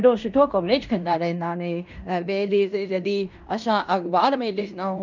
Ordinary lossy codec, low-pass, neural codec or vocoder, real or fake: none; 7.2 kHz; codec, 16 kHz in and 24 kHz out, 0.9 kbps, LongCat-Audio-Codec, fine tuned four codebook decoder; fake